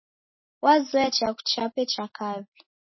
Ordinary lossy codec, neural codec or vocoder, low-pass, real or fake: MP3, 24 kbps; none; 7.2 kHz; real